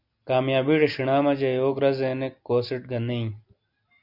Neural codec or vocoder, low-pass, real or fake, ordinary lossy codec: none; 5.4 kHz; real; MP3, 48 kbps